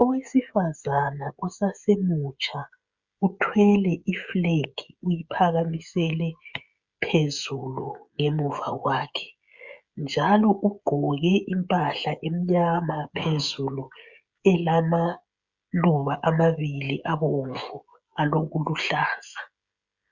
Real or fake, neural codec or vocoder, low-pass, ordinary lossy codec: fake; codec, 16 kHz, 16 kbps, FreqCodec, smaller model; 7.2 kHz; Opus, 64 kbps